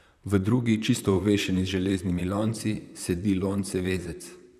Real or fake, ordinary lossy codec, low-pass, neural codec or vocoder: fake; none; 14.4 kHz; vocoder, 44.1 kHz, 128 mel bands, Pupu-Vocoder